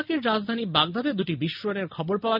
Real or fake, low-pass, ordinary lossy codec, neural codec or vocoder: real; 5.4 kHz; none; none